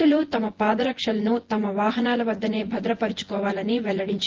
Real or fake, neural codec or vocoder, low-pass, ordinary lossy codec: fake; vocoder, 24 kHz, 100 mel bands, Vocos; 7.2 kHz; Opus, 16 kbps